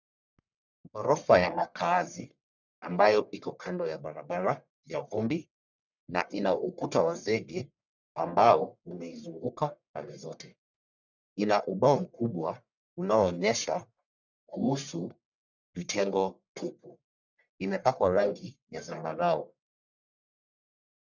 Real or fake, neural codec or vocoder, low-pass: fake; codec, 44.1 kHz, 1.7 kbps, Pupu-Codec; 7.2 kHz